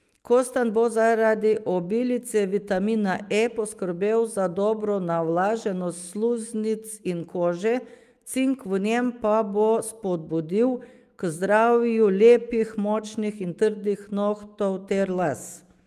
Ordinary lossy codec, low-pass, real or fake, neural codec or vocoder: Opus, 32 kbps; 14.4 kHz; fake; autoencoder, 48 kHz, 128 numbers a frame, DAC-VAE, trained on Japanese speech